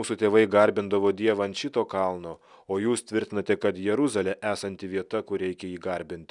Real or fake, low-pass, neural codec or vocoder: real; 10.8 kHz; none